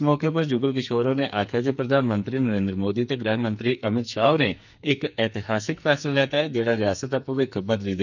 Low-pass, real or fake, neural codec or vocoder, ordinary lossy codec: 7.2 kHz; fake; codec, 44.1 kHz, 2.6 kbps, SNAC; none